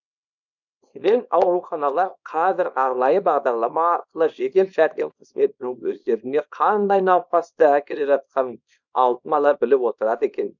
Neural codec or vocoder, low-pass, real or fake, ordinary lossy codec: codec, 24 kHz, 0.9 kbps, WavTokenizer, small release; 7.2 kHz; fake; none